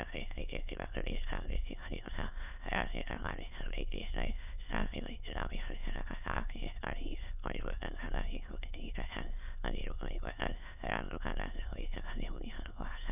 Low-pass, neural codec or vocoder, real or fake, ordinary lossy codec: 3.6 kHz; autoencoder, 22.05 kHz, a latent of 192 numbers a frame, VITS, trained on many speakers; fake; none